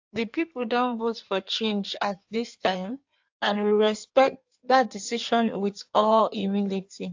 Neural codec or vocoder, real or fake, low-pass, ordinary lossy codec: codec, 16 kHz in and 24 kHz out, 1.1 kbps, FireRedTTS-2 codec; fake; 7.2 kHz; AAC, 48 kbps